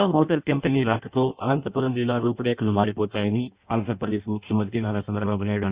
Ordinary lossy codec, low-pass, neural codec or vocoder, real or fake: Opus, 64 kbps; 3.6 kHz; codec, 16 kHz in and 24 kHz out, 0.6 kbps, FireRedTTS-2 codec; fake